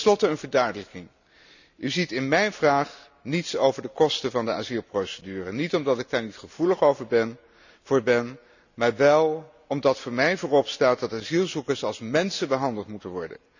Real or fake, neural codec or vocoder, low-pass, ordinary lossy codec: real; none; 7.2 kHz; none